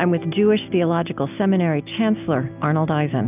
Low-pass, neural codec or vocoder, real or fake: 3.6 kHz; none; real